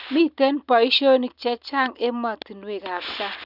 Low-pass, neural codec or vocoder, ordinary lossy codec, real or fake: 5.4 kHz; none; none; real